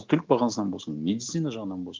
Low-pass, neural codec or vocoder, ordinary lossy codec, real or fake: 7.2 kHz; none; Opus, 32 kbps; real